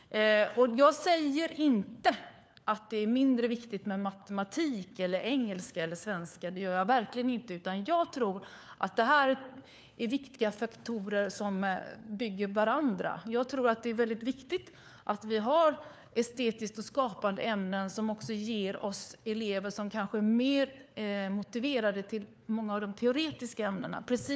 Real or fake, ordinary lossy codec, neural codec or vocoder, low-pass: fake; none; codec, 16 kHz, 4 kbps, FunCodec, trained on LibriTTS, 50 frames a second; none